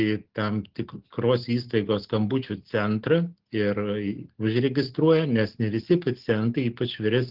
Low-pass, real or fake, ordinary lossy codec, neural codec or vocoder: 5.4 kHz; real; Opus, 16 kbps; none